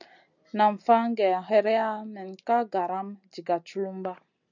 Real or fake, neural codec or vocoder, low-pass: real; none; 7.2 kHz